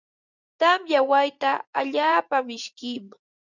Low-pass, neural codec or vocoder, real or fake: 7.2 kHz; vocoder, 24 kHz, 100 mel bands, Vocos; fake